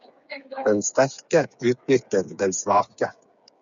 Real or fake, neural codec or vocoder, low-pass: fake; codec, 16 kHz, 8 kbps, FreqCodec, smaller model; 7.2 kHz